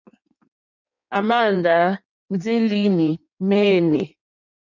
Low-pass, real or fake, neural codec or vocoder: 7.2 kHz; fake; codec, 16 kHz in and 24 kHz out, 1.1 kbps, FireRedTTS-2 codec